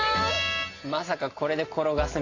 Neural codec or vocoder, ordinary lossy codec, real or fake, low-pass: none; AAC, 32 kbps; real; 7.2 kHz